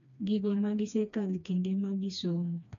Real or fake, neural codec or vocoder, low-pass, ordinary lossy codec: fake; codec, 16 kHz, 2 kbps, FreqCodec, smaller model; 7.2 kHz; none